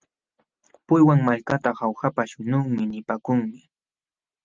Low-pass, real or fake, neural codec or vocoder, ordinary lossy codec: 7.2 kHz; real; none; Opus, 24 kbps